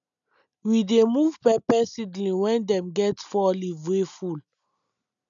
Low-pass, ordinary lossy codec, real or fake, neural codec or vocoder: 7.2 kHz; none; real; none